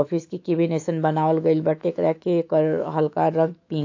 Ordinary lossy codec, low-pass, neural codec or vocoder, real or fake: AAC, 48 kbps; 7.2 kHz; autoencoder, 48 kHz, 128 numbers a frame, DAC-VAE, trained on Japanese speech; fake